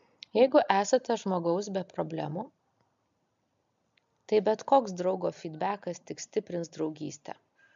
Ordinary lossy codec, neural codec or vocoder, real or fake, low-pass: MP3, 64 kbps; none; real; 7.2 kHz